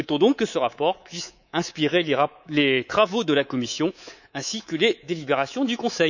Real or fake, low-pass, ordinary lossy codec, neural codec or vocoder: fake; 7.2 kHz; none; codec, 24 kHz, 3.1 kbps, DualCodec